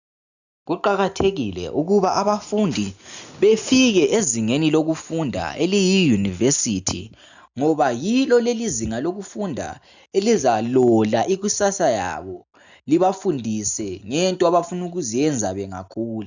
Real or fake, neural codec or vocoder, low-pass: real; none; 7.2 kHz